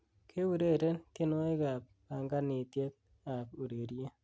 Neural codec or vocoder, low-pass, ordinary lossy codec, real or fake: none; none; none; real